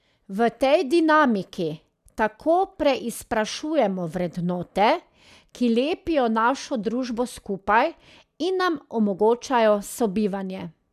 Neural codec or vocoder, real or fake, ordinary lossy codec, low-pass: none; real; none; 14.4 kHz